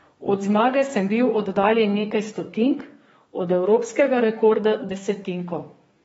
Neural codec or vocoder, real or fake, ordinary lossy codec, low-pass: codec, 32 kHz, 1.9 kbps, SNAC; fake; AAC, 24 kbps; 14.4 kHz